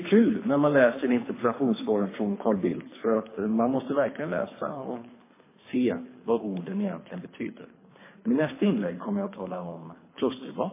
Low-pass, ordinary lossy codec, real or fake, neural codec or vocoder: 3.6 kHz; MP3, 16 kbps; fake; codec, 24 kHz, 3 kbps, HILCodec